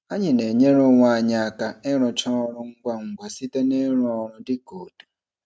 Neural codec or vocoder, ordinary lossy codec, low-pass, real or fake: none; none; none; real